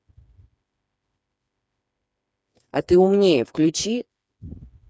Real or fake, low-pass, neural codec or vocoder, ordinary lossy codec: fake; none; codec, 16 kHz, 4 kbps, FreqCodec, smaller model; none